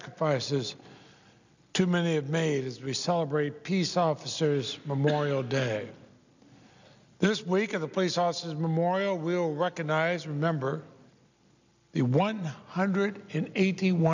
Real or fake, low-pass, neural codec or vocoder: real; 7.2 kHz; none